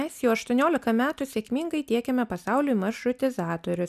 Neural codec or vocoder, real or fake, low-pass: none; real; 14.4 kHz